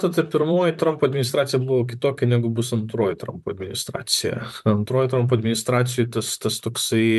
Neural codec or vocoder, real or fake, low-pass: vocoder, 44.1 kHz, 128 mel bands, Pupu-Vocoder; fake; 14.4 kHz